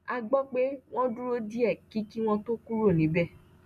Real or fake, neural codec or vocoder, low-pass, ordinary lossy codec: real; none; 14.4 kHz; none